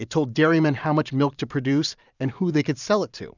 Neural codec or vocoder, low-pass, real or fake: vocoder, 44.1 kHz, 128 mel bands every 512 samples, BigVGAN v2; 7.2 kHz; fake